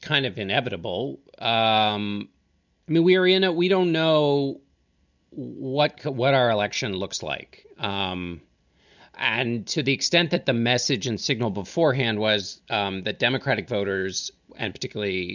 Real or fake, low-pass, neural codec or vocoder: real; 7.2 kHz; none